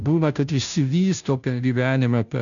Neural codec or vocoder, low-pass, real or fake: codec, 16 kHz, 0.5 kbps, FunCodec, trained on Chinese and English, 25 frames a second; 7.2 kHz; fake